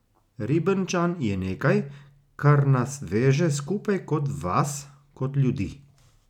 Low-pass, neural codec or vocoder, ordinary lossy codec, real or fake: 19.8 kHz; none; none; real